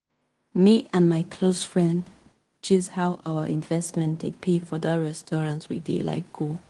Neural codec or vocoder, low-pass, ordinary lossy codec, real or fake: codec, 16 kHz in and 24 kHz out, 0.9 kbps, LongCat-Audio-Codec, fine tuned four codebook decoder; 10.8 kHz; Opus, 24 kbps; fake